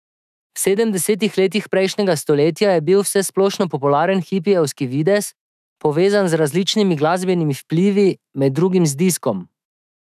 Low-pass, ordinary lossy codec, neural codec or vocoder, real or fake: 14.4 kHz; none; autoencoder, 48 kHz, 128 numbers a frame, DAC-VAE, trained on Japanese speech; fake